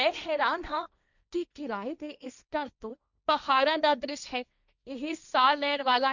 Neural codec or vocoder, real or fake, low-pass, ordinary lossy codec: codec, 16 kHz, 1.1 kbps, Voila-Tokenizer; fake; 7.2 kHz; none